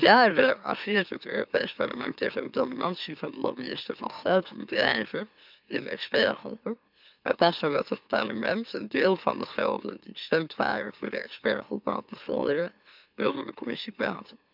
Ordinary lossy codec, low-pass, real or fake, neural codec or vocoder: none; 5.4 kHz; fake; autoencoder, 44.1 kHz, a latent of 192 numbers a frame, MeloTTS